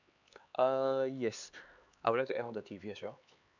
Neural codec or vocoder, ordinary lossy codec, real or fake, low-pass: codec, 16 kHz, 4 kbps, X-Codec, HuBERT features, trained on LibriSpeech; none; fake; 7.2 kHz